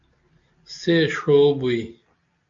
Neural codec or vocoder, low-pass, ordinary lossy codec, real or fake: none; 7.2 kHz; AAC, 48 kbps; real